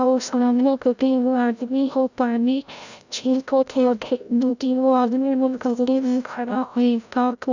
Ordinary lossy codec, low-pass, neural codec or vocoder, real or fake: none; 7.2 kHz; codec, 16 kHz, 0.5 kbps, FreqCodec, larger model; fake